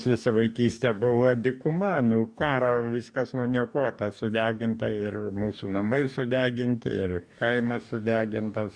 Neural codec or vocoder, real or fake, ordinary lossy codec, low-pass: codec, 44.1 kHz, 2.6 kbps, DAC; fake; MP3, 96 kbps; 9.9 kHz